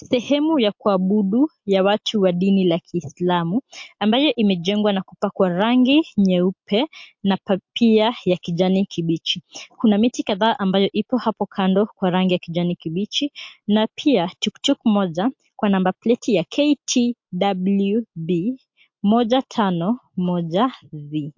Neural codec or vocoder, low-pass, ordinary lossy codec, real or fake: none; 7.2 kHz; MP3, 48 kbps; real